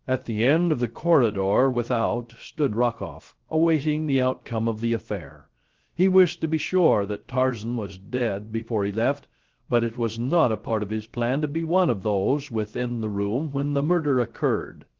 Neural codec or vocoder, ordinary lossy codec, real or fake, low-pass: codec, 16 kHz, 0.3 kbps, FocalCodec; Opus, 16 kbps; fake; 7.2 kHz